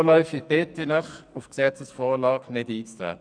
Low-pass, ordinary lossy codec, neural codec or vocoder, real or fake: 9.9 kHz; none; codec, 44.1 kHz, 2.6 kbps, SNAC; fake